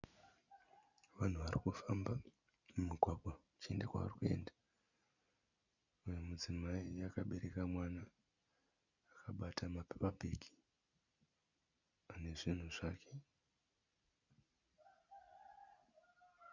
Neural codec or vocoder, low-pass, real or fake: none; 7.2 kHz; real